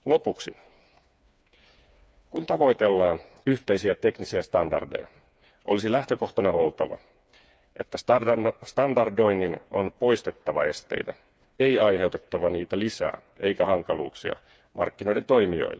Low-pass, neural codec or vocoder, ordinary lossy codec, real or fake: none; codec, 16 kHz, 4 kbps, FreqCodec, smaller model; none; fake